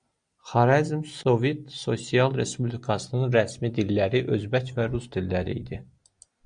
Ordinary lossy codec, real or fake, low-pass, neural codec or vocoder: Opus, 64 kbps; real; 9.9 kHz; none